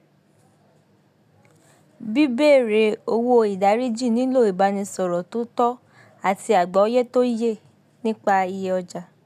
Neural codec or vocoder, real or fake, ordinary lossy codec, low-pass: none; real; none; 14.4 kHz